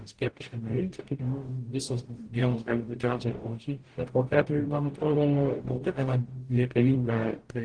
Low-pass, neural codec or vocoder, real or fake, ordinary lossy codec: 9.9 kHz; codec, 44.1 kHz, 0.9 kbps, DAC; fake; Opus, 16 kbps